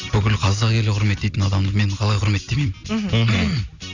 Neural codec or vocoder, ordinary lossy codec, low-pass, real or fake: none; none; 7.2 kHz; real